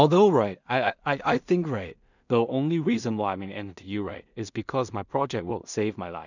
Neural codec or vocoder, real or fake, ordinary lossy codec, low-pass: codec, 16 kHz in and 24 kHz out, 0.4 kbps, LongCat-Audio-Codec, two codebook decoder; fake; none; 7.2 kHz